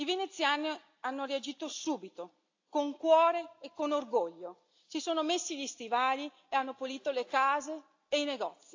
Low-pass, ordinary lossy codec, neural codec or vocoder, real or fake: 7.2 kHz; AAC, 48 kbps; none; real